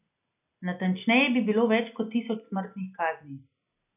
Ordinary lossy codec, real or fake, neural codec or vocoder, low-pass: none; real; none; 3.6 kHz